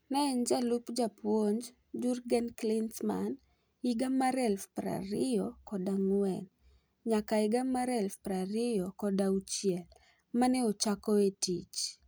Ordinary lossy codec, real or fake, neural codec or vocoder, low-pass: none; real; none; none